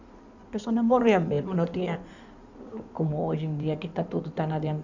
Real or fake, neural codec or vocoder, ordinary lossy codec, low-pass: fake; codec, 16 kHz in and 24 kHz out, 2.2 kbps, FireRedTTS-2 codec; none; 7.2 kHz